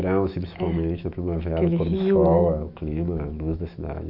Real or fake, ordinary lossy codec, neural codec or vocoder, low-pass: fake; none; autoencoder, 48 kHz, 128 numbers a frame, DAC-VAE, trained on Japanese speech; 5.4 kHz